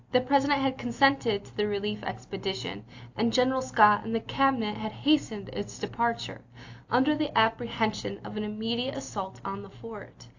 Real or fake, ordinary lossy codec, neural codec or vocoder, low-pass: real; AAC, 48 kbps; none; 7.2 kHz